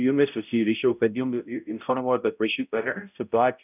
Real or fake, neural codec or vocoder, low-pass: fake; codec, 16 kHz, 0.5 kbps, X-Codec, HuBERT features, trained on balanced general audio; 3.6 kHz